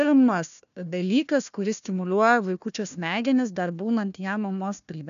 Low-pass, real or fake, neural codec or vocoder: 7.2 kHz; fake; codec, 16 kHz, 1 kbps, FunCodec, trained on Chinese and English, 50 frames a second